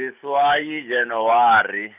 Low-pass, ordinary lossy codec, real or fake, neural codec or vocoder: 3.6 kHz; none; real; none